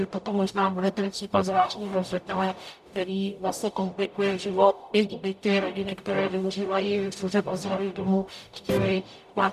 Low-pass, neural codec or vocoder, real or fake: 14.4 kHz; codec, 44.1 kHz, 0.9 kbps, DAC; fake